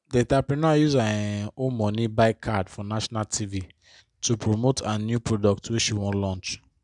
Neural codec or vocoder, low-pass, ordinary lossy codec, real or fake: none; 10.8 kHz; none; real